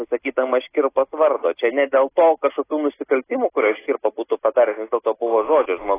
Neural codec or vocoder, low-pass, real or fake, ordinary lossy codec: none; 3.6 kHz; real; AAC, 16 kbps